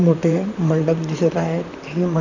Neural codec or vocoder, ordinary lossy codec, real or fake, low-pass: vocoder, 44.1 kHz, 128 mel bands, Pupu-Vocoder; none; fake; 7.2 kHz